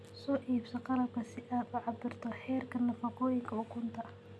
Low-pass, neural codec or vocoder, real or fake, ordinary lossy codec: none; none; real; none